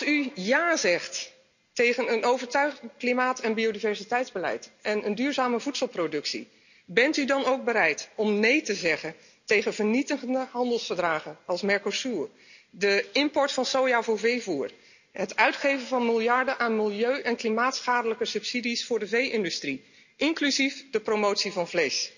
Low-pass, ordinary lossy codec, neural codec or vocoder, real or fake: 7.2 kHz; none; none; real